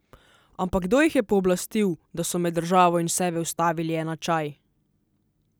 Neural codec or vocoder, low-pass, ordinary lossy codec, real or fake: none; none; none; real